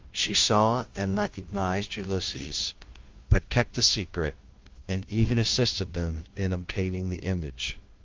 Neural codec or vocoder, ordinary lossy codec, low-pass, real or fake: codec, 16 kHz, 0.5 kbps, FunCodec, trained on Chinese and English, 25 frames a second; Opus, 32 kbps; 7.2 kHz; fake